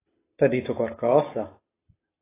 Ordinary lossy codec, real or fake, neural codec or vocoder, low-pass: AAC, 16 kbps; real; none; 3.6 kHz